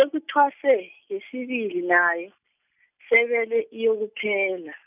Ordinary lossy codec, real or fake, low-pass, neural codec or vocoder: none; real; 3.6 kHz; none